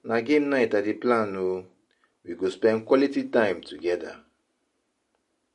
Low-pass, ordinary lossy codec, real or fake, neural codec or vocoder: 14.4 kHz; MP3, 48 kbps; real; none